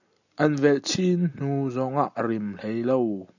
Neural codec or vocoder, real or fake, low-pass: none; real; 7.2 kHz